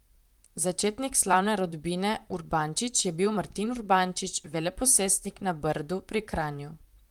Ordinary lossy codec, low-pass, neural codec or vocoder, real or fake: Opus, 32 kbps; 19.8 kHz; vocoder, 44.1 kHz, 128 mel bands, Pupu-Vocoder; fake